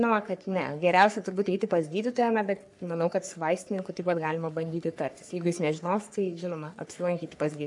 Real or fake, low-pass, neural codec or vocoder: fake; 10.8 kHz; codec, 44.1 kHz, 3.4 kbps, Pupu-Codec